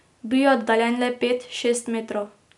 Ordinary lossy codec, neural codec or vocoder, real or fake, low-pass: none; none; real; 10.8 kHz